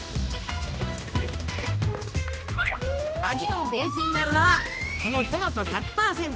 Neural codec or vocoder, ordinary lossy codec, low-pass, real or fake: codec, 16 kHz, 1 kbps, X-Codec, HuBERT features, trained on general audio; none; none; fake